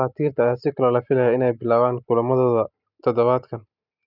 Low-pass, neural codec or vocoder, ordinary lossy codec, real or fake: 5.4 kHz; none; none; real